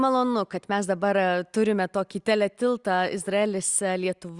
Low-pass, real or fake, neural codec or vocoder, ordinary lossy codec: 10.8 kHz; real; none; Opus, 64 kbps